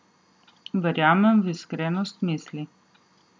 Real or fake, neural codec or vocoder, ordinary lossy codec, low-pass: real; none; none; none